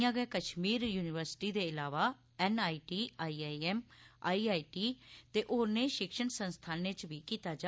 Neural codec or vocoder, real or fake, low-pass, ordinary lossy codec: none; real; none; none